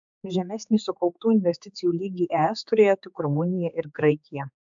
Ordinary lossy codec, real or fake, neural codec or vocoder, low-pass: MP3, 64 kbps; fake; codec, 16 kHz, 4 kbps, X-Codec, HuBERT features, trained on general audio; 7.2 kHz